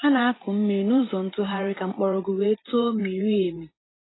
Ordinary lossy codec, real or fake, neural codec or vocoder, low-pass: AAC, 16 kbps; fake; vocoder, 44.1 kHz, 128 mel bands every 512 samples, BigVGAN v2; 7.2 kHz